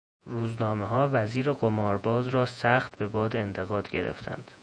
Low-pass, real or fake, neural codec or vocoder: 9.9 kHz; fake; vocoder, 48 kHz, 128 mel bands, Vocos